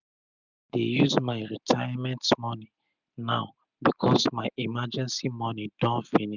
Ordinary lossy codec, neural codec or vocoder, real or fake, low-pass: none; none; real; 7.2 kHz